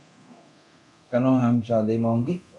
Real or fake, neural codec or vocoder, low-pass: fake; codec, 24 kHz, 0.9 kbps, DualCodec; 10.8 kHz